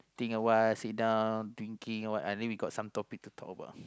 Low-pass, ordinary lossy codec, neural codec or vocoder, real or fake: none; none; none; real